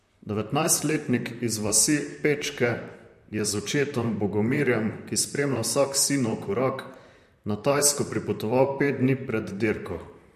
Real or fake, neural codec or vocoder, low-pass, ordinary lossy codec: fake; vocoder, 44.1 kHz, 128 mel bands, Pupu-Vocoder; 14.4 kHz; MP3, 64 kbps